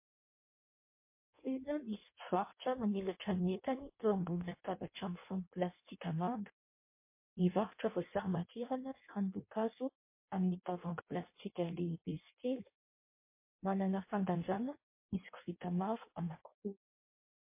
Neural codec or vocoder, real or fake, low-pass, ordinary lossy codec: codec, 16 kHz in and 24 kHz out, 0.6 kbps, FireRedTTS-2 codec; fake; 3.6 kHz; MP3, 24 kbps